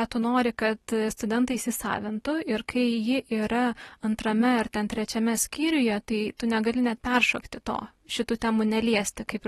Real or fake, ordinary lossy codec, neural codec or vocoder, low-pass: real; AAC, 32 kbps; none; 19.8 kHz